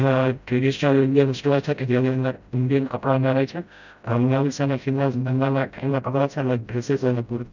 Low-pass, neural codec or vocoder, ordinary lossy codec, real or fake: 7.2 kHz; codec, 16 kHz, 0.5 kbps, FreqCodec, smaller model; none; fake